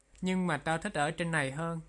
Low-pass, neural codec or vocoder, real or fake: 10.8 kHz; none; real